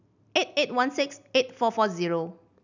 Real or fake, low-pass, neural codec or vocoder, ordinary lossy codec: real; 7.2 kHz; none; none